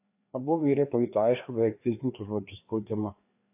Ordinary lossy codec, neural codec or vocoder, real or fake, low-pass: AAC, 32 kbps; codec, 16 kHz, 2 kbps, FreqCodec, larger model; fake; 3.6 kHz